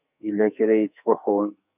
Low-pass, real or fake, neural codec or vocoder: 3.6 kHz; fake; codec, 44.1 kHz, 2.6 kbps, SNAC